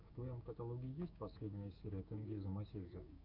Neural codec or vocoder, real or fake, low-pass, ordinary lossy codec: codec, 16 kHz, 6 kbps, DAC; fake; 5.4 kHz; MP3, 48 kbps